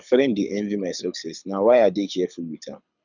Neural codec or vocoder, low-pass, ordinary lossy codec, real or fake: codec, 24 kHz, 6 kbps, HILCodec; 7.2 kHz; none; fake